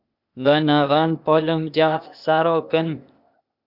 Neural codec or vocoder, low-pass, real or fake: codec, 16 kHz, 0.8 kbps, ZipCodec; 5.4 kHz; fake